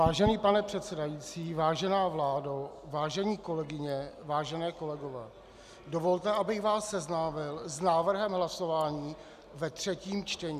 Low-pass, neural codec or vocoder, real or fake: 14.4 kHz; none; real